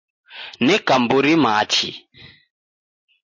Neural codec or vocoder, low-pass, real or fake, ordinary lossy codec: none; 7.2 kHz; real; MP3, 32 kbps